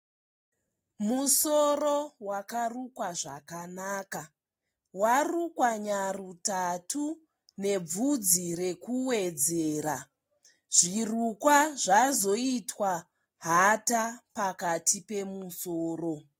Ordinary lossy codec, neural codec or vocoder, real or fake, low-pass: AAC, 48 kbps; none; real; 19.8 kHz